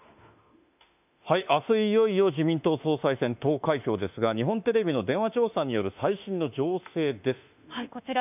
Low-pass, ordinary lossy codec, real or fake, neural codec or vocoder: 3.6 kHz; none; fake; autoencoder, 48 kHz, 32 numbers a frame, DAC-VAE, trained on Japanese speech